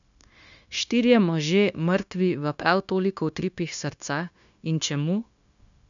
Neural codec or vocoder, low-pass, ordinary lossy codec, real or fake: codec, 16 kHz, 0.9 kbps, LongCat-Audio-Codec; 7.2 kHz; none; fake